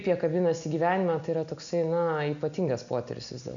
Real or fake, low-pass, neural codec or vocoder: real; 7.2 kHz; none